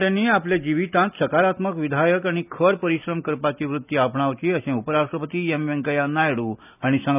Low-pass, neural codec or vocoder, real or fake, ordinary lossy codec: 3.6 kHz; none; real; none